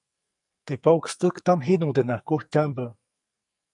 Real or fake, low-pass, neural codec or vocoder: fake; 10.8 kHz; codec, 44.1 kHz, 2.6 kbps, SNAC